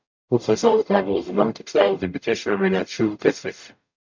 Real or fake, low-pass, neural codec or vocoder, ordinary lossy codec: fake; 7.2 kHz; codec, 44.1 kHz, 0.9 kbps, DAC; MP3, 64 kbps